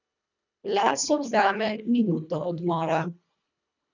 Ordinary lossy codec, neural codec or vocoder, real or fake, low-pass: none; codec, 24 kHz, 1.5 kbps, HILCodec; fake; 7.2 kHz